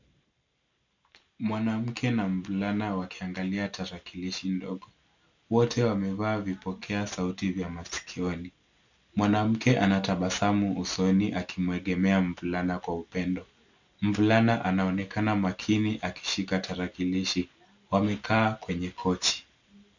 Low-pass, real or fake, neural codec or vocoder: 7.2 kHz; real; none